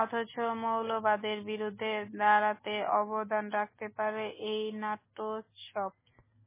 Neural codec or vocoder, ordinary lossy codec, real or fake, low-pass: none; MP3, 16 kbps; real; 3.6 kHz